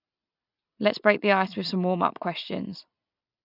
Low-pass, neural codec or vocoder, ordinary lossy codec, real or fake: 5.4 kHz; none; none; real